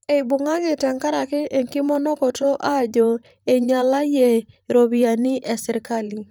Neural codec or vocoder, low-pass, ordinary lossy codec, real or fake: vocoder, 44.1 kHz, 128 mel bands, Pupu-Vocoder; none; none; fake